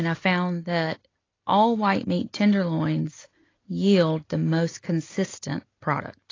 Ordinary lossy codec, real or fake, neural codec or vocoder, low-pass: AAC, 32 kbps; real; none; 7.2 kHz